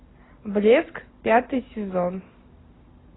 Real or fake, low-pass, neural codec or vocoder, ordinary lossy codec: real; 7.2 kHz; none; AAC, 16 kbps